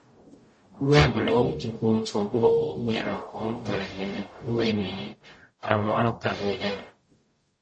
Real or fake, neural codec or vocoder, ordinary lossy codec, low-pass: fake; codec, 44.1 kHz, 0.9 kbps, DAC; MP3, 32 kbps; 9.9 kHz